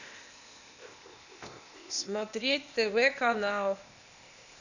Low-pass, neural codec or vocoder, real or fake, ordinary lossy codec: 7.2 kHz; codec, 16 kHz, 0.8 kbps, ZipCodec; fake; none